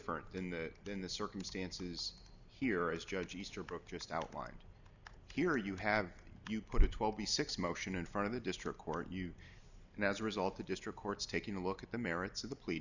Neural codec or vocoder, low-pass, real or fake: none; 7.2 kHz; real